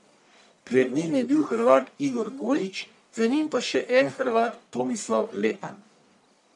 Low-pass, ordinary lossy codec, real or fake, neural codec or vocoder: 10.8 kHz; none; fake; codec, 44.1 kHz, 1.7 kbps, Pupu-Codec